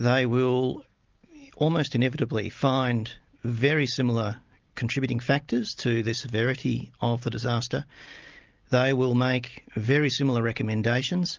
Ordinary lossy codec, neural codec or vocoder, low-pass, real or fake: Opus, 32 kbps; none; 7.2 kHz; real